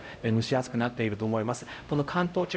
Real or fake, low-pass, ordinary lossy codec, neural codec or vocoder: fake; none; none; codec, 16 kHz, 0.5 kbps, X-Codec, HuBERT features, trained on LibriSpeech